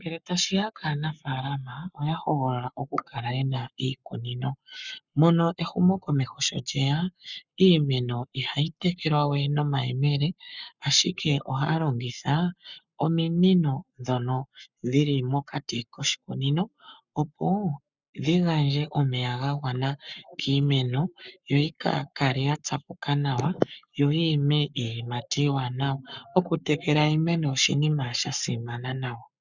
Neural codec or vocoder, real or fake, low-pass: codec, 44.1 kHz, 7.8 kbps, Pupu-Codec; fake; 7.2 kHz